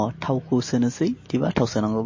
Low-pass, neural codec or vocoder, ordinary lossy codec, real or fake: 7.2 kHz; none; MP3, 32 kbps; real